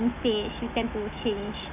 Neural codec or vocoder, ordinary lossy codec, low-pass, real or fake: autoencoder, 48 kHz, 128 numbers a frame, DAC-VAE, trained on Japanese speech; none; 3.6 kHz; fake